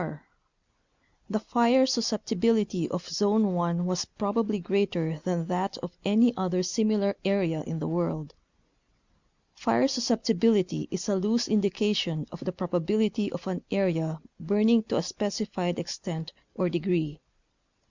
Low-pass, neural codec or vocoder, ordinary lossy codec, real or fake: 7.2 kHz; none; Opus, 64 kbps; real